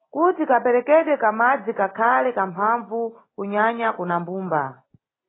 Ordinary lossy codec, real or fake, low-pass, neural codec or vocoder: AAC, 16 kbps; real; 7.2 kHz; none